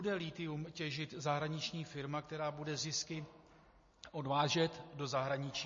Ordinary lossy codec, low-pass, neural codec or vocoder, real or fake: MP3, 32 kbps; 7.2 kHz; none; real